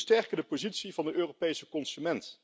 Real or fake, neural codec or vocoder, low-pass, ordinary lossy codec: real; none; none; none